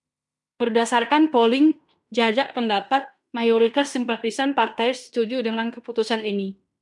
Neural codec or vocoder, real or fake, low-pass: codec, 16 kHz in and 24 kHz out, 0.9 kbps, LongCat-Audio-Codec, fine tuned four codebook decoder; fake; 10.8 kHz